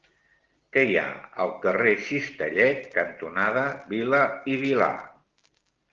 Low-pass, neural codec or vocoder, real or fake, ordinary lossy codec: 7.2 kHz; none; real; Opus, 16 kbps